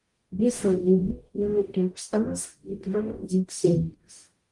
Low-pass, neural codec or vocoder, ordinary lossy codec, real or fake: 10.8 kHz; codec, 44.1 kHz, 0.9 kbps, DAC; Opus, 24 kbps; fake